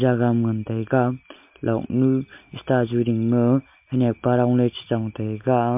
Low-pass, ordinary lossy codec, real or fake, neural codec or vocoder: 3.6 kHz; MP3, 32 kbps; real; none